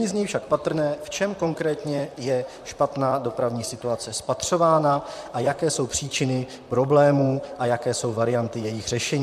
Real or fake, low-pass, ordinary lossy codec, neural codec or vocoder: fake; 14.4 kHz; MP3, 96 kbps; vocoder, 44.1 kHz, 128 mel bands, Pupu-Vocoder